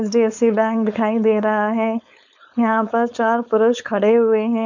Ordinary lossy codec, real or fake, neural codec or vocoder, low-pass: none; fake; codec, 16 kHz, 4.8 kbps, FACodec; 7.2 kHz